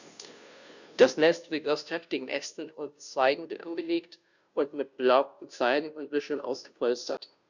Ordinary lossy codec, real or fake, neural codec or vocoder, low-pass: none; fake; codec, 16 kHz, 0.5 kbps, FunCodec, trained on Chinese and English, 25 frames a second; 7.2 kHz